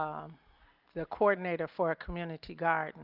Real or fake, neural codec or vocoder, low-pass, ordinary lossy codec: real; none; 5.4 kHz; Opus, 32 kbps